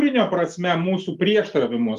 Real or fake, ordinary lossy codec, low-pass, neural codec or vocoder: real; Opus, 32 kbps; 14.4 kHz; none